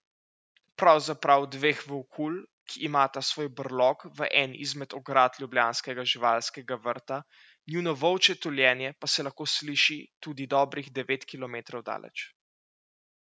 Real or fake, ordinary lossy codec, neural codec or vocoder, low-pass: real; none; none; none